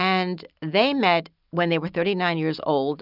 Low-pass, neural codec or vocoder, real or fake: 5.4 kHz; none; real